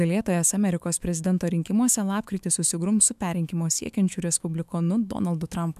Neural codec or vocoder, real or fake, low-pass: autoencoder, 48 kHz, 128 numbers a frame, DAC-VAE, trained on Japanese speech; fake; 14.4 kHz